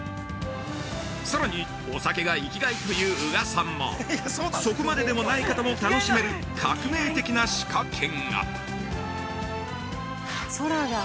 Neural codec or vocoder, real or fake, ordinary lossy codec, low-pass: none; real; none; none